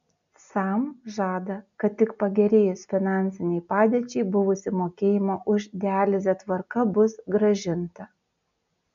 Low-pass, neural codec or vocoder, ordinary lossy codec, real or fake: 7.2 kHz; none; AAC, 96 kbps; real